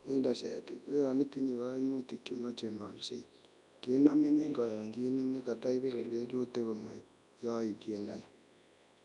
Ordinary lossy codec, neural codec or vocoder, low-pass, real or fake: none; codec, 24 kHz, 0.9 kbps, WavTokenizer, large speech release; 10.8 kHz; fake